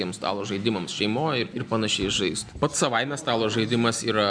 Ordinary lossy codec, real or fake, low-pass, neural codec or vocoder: MP3, 96 kbps; real; 9.9 kHz; none